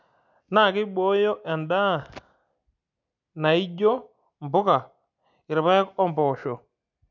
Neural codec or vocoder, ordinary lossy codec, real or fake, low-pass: none; none; real; 7.2 kHz